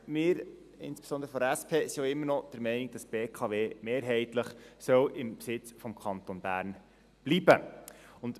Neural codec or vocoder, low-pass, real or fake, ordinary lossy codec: none; 14.4 kHz; real; none